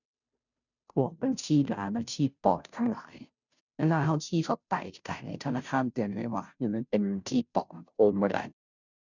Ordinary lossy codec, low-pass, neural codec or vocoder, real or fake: none; 7.2 kHz; codec, 16 kHz, 0.5 kbps, FunCodec, trained on Chinese and English, 25 frames a second; fake